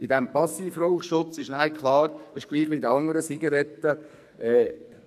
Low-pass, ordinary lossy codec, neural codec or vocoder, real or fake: 14.4 kHz; none; codec, 44.1 kHz, 2.6 kbps, SNAC; fake